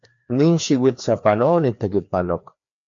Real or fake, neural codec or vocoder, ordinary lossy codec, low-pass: fake; codec, 16 kHz, 2 kbps, FreqCodec, larger model; MP3, 48 kbps; 7.2 kHz